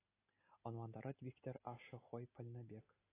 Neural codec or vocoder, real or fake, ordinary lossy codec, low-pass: none; real; AAC, 16 kbps; 3.6 kHz